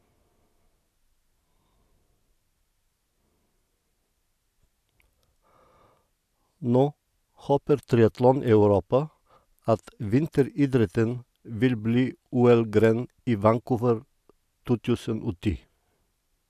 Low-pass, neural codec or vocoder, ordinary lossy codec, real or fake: 14.4 kHz; none; none; real